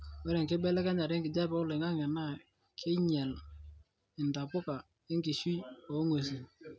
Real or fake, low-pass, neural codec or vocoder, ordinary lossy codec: real; none; none; none